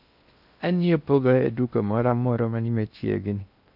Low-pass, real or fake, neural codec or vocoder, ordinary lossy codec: 5.4 kHz; fake; codec, 16 kHz in and 24 kHz out, 0.6 kbps, FocalCodec, streaming, 2048 codes; none